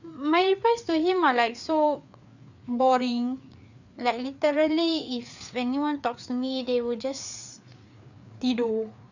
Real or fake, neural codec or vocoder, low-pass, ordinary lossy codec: fake; codec, 16 kHz, 4 kbps, FreqCodec, larger model; 7.2 kHz; none